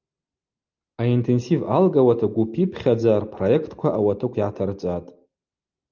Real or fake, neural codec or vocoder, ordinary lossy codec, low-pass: real; none; Opus, 24 kbps; 7.2 kHz